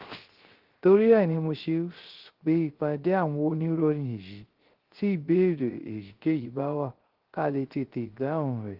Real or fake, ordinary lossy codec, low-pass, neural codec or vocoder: fake; Opus, 16 kbps; 5.4 kHz; codec, 16 kHz, 0.3 kbps, FocalCodec